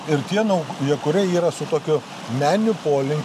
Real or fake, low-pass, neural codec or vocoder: real; 14.4 kHz; none